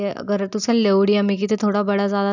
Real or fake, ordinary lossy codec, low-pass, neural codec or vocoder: real; none; 7.2 kHz; none